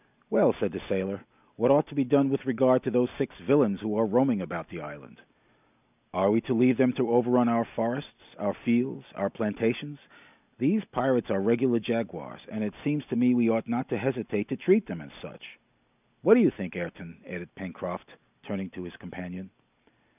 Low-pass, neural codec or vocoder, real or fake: 3.6 kHz; none; real